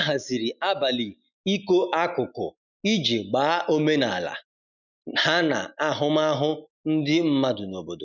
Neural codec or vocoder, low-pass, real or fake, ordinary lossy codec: none; 7.2 kHz; real; none